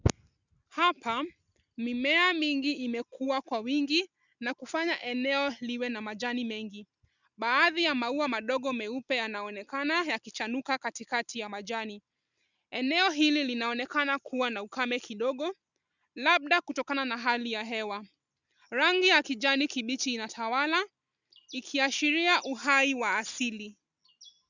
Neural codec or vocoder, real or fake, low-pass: none; real; 7.2 kHz